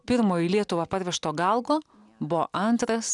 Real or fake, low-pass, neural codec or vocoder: real; 10.8 kHz; none